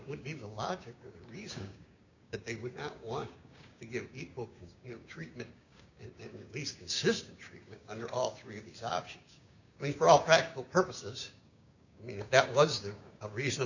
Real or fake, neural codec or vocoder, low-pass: fake; codec, 16 kHz, 6 kbps, DAC; 7.2 kHz